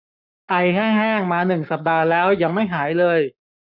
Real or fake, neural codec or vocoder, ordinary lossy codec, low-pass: fake; codec, 44.1 kHz, 7.8 kbps, Pupu-Codec; none; 5.4 kHz